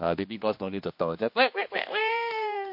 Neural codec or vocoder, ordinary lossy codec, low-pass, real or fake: codec, 16 kHz, 2 kbps, X-Codec, HuBERT features, trained on general audio; MP3, 32 kbps; 5.4 kHz; fake